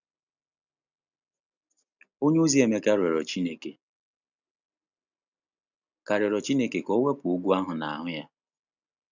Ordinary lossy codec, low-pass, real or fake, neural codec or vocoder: none; 7.2 kHz; real; none